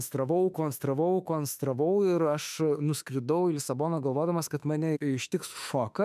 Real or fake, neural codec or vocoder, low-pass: fake; autoencoder, 48 kHz, 32 numbers a frame, DAC-VAE, trained on Japanese speech; 14.4 kHz